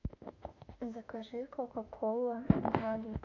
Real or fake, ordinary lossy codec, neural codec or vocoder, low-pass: fake; MP3, 48 kbps; autoencoder, 48 kHz, 32 numbers a frame, DAC-VAE, trained on Japanese speech; 7.2 kHz